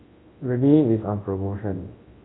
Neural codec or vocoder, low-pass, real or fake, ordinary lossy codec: codec, 24 kHz, 0.9 kbps, WavTokenizer, large speech release; 7.2 kHz; fake; AAC, 16 kbps